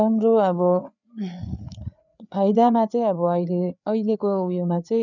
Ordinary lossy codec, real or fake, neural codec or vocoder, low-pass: none; fake; codec, 16 kHz, 4 kbps, FreqCodec, larger model; 7.2 kHz